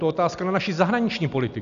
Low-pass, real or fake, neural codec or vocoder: 7.2 kHz; real; none